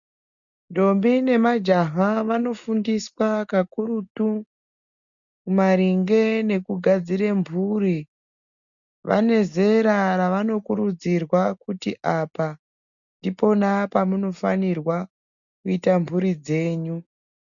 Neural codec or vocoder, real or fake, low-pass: none; real; 7.2 kHz